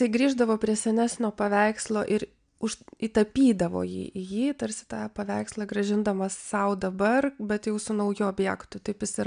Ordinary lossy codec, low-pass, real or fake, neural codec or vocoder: MP3, 96 kbps; 9.9 kHz; real; none